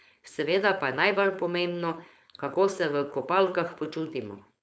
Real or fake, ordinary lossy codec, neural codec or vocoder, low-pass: fake; none; codec, 16 kHz, 4.8 kbps, FACodec; none